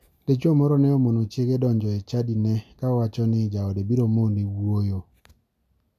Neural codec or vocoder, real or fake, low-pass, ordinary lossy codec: none; real; 14.4 kHz; none